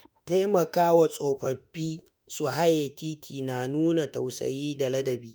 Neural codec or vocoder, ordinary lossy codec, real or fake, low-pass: autoencoder, 48 kHz, 32 numbers a frame, DAC-VAE, trained on Japanese speech; none; fake; none